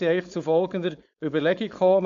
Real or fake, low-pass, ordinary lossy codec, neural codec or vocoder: fake; 7.2 kHz; MP3, 64 kbps; codec, 16 kHz, 4.8 kbps, FACodec